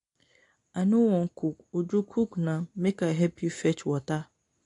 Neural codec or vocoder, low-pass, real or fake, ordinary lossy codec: none; 10.8 kHz; real; AAC, 48 kbps